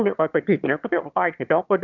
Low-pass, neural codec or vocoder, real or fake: 7.2 kHz; autoencoder, 22.05 kHz, a latent of 192 numbers a frame, VITS, trained on one speaker; fake